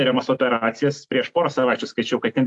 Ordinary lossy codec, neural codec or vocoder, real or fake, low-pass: AAC, 64 kbps; none; real; 10.8 kHz